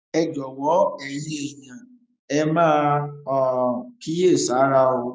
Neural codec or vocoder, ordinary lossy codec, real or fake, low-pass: codec, 16 kHz, 6 kbps, DAC; none; fake; none